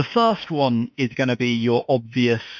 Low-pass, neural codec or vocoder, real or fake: 7.2 kHz; autoencoder, 48 kHz, 32 numbers a frame, DAC-VAE, trained on Japanese speech; fake